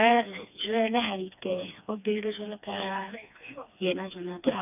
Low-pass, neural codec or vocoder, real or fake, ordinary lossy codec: 3.6 kHz; codec, 16 kHz, 2 kbps, FreqCodec, smaller model; fake; none